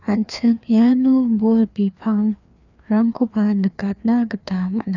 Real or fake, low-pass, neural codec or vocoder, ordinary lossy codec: fake; 7.2 kHz; codec, 16 kHz, 2 kbps, FreqCodec, larger model; none